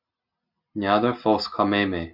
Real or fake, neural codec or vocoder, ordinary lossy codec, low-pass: real; none; MP3, 48 kbps; 5.4 kHz